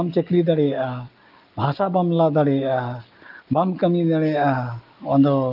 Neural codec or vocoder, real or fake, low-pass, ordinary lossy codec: none; real; 5.4 kHz; Opus, 32 kbps